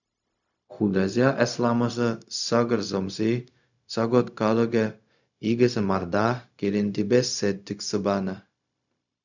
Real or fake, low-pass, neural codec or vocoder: fake; 7.2 kHz; codec, 16 kHz, 0.4 kbps, LongCat-Audio-Codec